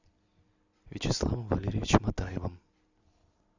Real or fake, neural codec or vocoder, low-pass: real; none; 7.2 kHz